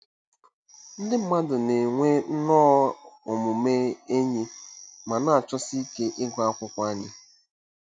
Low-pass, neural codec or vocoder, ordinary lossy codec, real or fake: 9.9 kHz; none; none; real